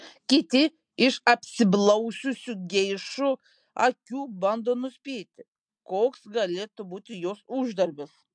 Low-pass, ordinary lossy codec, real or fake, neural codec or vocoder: 9.9 kHz; MP3, 64 kbps; real; none